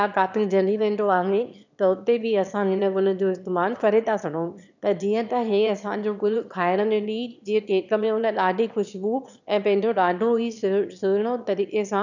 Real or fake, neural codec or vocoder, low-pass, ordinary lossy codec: fake; autoencoder, 22.05 kHz, a latent of 192 numbers a frame, VITS, trained on one speaker; 7.2 kHz; none